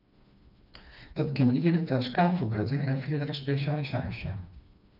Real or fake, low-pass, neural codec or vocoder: fake; 5.4 kHz; codec, 16 kHz, 2 kbps, FreqCodec, smaller model